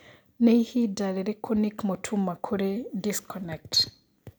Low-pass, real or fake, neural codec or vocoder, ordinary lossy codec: none; real; none; none